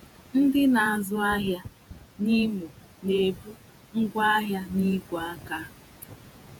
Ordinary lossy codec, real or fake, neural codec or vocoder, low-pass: none; fake; vocoder, 48 kHz, 128 mel bands, Vocos; 19.8 kHz